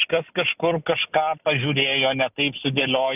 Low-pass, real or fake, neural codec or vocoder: 3.6 kHz; real; none